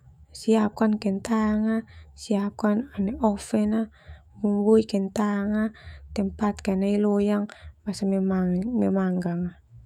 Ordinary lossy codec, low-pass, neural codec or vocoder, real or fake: none; 19.8 kHz; autoencoder, 48 kHz, 128 numbers a frame, DAC-VAE, trained on Japanese speech; fake